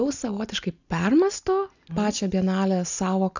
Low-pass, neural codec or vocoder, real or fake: 7.2 kHz; none; real